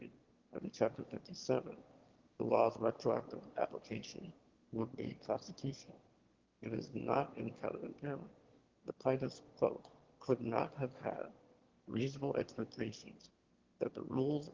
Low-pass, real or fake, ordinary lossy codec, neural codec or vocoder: 7.2 kHz; fake; Opus, 16 kbps; autoencoder, 22.05 kHz, a latent of 192 numbers a frame, VITS, trained on one speaker